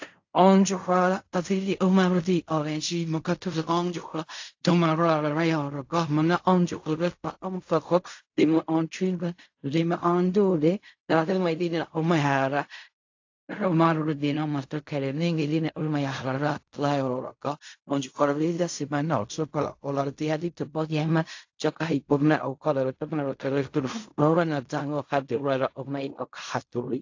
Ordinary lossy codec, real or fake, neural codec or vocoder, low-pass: AAC, 48 kbps; fake; codec, 16 kHz in and 24 kHz out, 0.4 kbps, LongCat-Audio-Codec, fine tuned four codebook decoder; 7.2 kHz